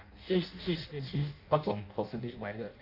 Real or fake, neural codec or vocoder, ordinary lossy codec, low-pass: fake; codec, 16 kHz in and 24 kHz out, 0.6 kbps, FireRedTTS-2 codec; none; 5.4 kHz